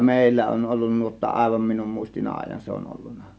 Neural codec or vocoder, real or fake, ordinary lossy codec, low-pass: none; real; none; none